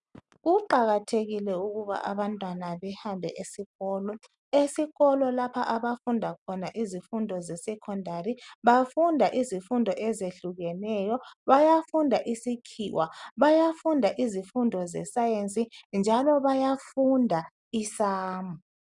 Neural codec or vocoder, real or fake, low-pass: none; real; 10.8 kHz